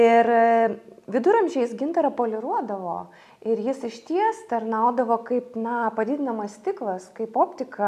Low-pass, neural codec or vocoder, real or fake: 14.4 kHz; none; real